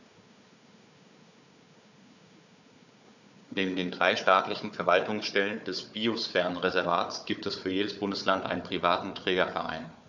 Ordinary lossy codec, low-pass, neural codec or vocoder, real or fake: none; 7.2 kHz; codec, 16 kHz, 4 kbps, FunCodec, trained on Chinese and English, 50 frames a second; fake